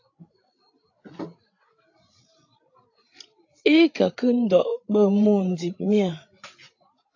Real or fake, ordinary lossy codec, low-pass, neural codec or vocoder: fake; AAC, 48 kbps; 7.2 kHz; codec, 16 kHz, 8 kbps, FreqCodec, larger model